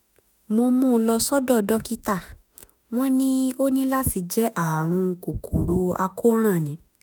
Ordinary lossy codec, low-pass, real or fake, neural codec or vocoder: none; none; fake; autoencoder, 48 kHz, 32 numbers a frame, DAC-VAE, trained on Japanese speech